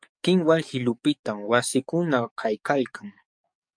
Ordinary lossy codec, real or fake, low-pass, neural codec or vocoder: MP3, 64 kbps; fake; 9.9 kHz; codec, 44.1 kHz, 7.8 kbps, DAC